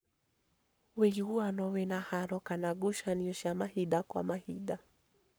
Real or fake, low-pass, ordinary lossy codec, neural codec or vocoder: fake; none; none; codec, 44.1 kHz, 7.8 kbps, Pupu-Codec